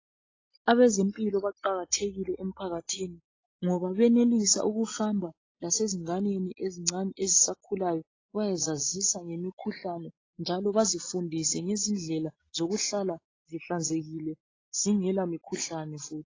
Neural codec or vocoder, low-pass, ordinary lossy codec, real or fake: none; 7.2 kHz; AAC, 32 kbps; real